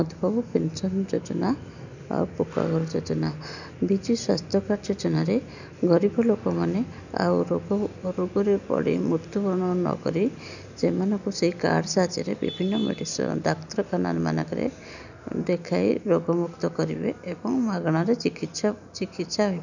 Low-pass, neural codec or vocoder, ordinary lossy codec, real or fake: 7.2 kHz; none; none; real